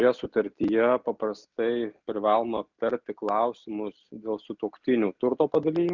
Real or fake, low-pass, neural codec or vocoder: real; 7.2 kHz; none